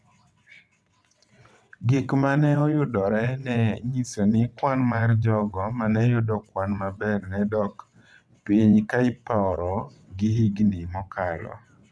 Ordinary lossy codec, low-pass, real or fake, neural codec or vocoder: none; none; fake; vocoder, 22.05 kHz, 80 mel bands, WaveNeXt